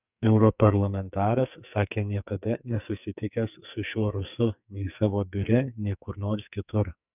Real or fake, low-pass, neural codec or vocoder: fake; 3.6 kHz; codec, 44.1 kHz, 2.6 kbps, SNAC